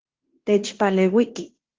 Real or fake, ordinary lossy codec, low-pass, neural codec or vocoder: fake; Opus, 16 kbps; 7.2 kHz; codec, 16 kHz in and 24 kHz out, 0.9 kbps, LongCat-Audio-Codec, fine tuned four codebook decoder